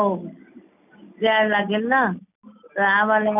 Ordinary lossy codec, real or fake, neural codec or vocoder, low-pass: none; real; none; 3.6 kHz